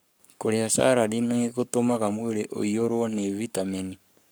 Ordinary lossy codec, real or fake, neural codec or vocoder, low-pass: none; fake; codec, 44.1 kHz, 7.8 kbps, Pupu-Codec; none